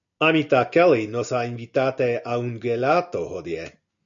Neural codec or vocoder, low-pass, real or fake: none; 7.2 kHz; real